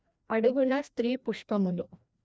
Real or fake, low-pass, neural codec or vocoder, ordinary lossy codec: fake; none; codec, 16 kHz, 1 kbps, FreqCodec, larger model; none